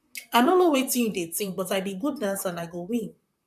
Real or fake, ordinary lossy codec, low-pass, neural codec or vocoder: fake; none; 14.4 kHz; vocoder, 44.1 kHz, 128 mel bands, Pupu-Vocoder